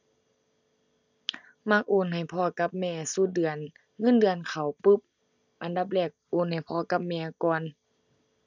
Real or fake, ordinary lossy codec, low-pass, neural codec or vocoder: real; none; 7.2 kHz; none